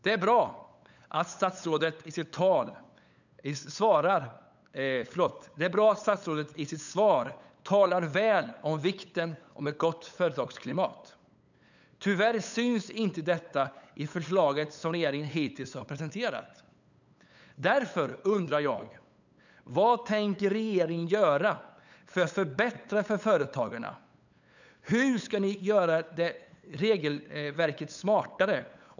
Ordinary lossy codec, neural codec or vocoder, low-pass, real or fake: none; codec, 16 kHz, 8 kbps, FunCodec, trained on LibriTTS, 25 frames a second; 7.2 kHz; fake